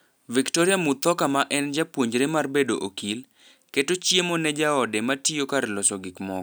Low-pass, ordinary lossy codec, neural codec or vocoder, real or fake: none; none; none; real